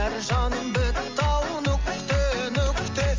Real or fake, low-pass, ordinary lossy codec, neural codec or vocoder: real; 7.2 kHz; Opus, 32 kbps; none